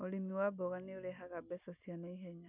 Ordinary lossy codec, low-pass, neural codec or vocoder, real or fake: none; 3.6 kHz; none; real